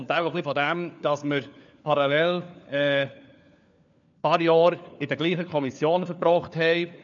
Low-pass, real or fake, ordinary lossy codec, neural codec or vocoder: 7.2 kHz; fake; MP3, 96 kbps; codec, 16 kHz, 4 kbps, FunCodec, trained on LibriTTS, 50 frames a second